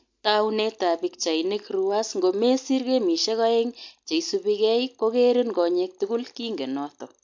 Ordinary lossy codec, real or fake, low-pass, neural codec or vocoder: MP3, 48 kbps; real; 7.2 kHz; none